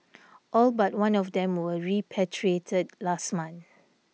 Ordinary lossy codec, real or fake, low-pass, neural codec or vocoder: none; real; none; none